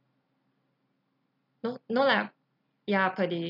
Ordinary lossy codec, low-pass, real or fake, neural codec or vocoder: none; 5.4 kHz; real; none